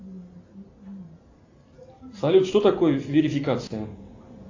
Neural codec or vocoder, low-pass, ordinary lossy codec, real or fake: none; 7.2 kHz; AAC, 48 kbps; real